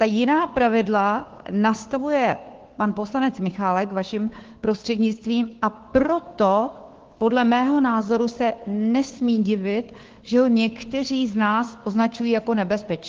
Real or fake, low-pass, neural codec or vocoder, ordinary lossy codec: fake; 7.2 kHz; codec, 16 kHz, 2 kbps, FunCodec, trained on Chinese and English, 25 frames a second; Opus, 16 kbps